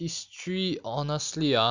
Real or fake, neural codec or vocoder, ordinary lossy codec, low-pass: real; none; Opus, 64 kbps; 7.2 kHz